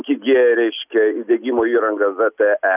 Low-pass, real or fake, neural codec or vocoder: 3.6 kHz; real; none